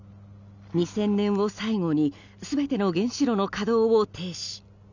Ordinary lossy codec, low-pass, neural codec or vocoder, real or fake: none; 7.2 kHz; none; real